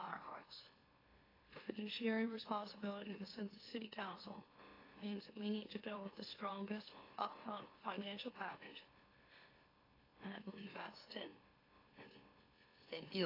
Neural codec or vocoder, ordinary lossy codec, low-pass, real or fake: autoencoder, 44.1 kHz, a latent of 192 numbers a frame, MeloTTS; AAC, 24 kbps; 5.4 kHz; fake